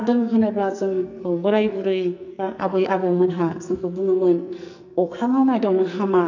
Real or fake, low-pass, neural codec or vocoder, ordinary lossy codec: fake; 7.2 kHz; codec, 44.1 kHz, 2.6 kbps, SNAC; none